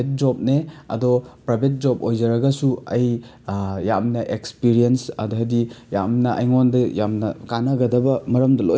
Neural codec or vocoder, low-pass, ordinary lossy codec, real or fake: none; none; none; real